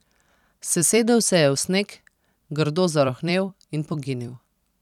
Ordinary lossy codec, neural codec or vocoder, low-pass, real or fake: none; vocoder, 44.1 kHz, 128 mel bands every 256 samples, BigVGAN v2; 19.8 kHz; fake